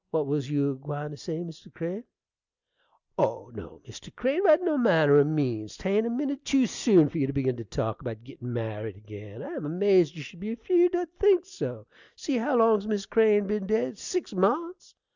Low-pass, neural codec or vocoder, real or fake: 7.2 kHz; none; real